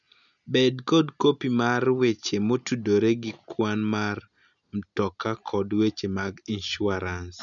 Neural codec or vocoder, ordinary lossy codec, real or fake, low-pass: none; none; real; 7.2 kHz